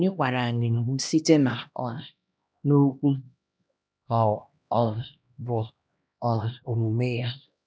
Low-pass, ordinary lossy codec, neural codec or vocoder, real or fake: none; none; codec, 16 kHz, 1 kbps, X-Codec, HuBERT features, trained on LibriSpeech; fake